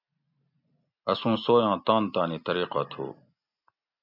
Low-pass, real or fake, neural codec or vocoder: 5.4 kHz; real; none